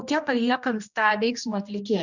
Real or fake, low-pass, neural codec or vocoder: fake; 7.2 kHz; codec, 16 kHz, 1 kbps, X-Codec, HuBERT features, trained on general audio